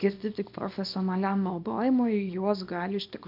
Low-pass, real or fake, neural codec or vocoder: 5.4 kHz; fake; codec, 24 kHz, 0.9 kbps, WavTokenizer, small release